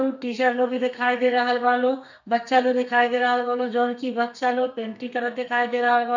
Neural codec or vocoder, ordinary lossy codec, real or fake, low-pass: codec, 32 kHz, 1.9 kbps, SNAC; none; fake; 7.2 kHz